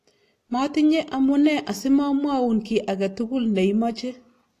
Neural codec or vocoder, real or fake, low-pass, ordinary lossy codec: none; real; 14.4 kHz; AAC, 48 kbps